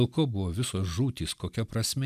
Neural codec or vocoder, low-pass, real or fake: none; 14.4 kHz; real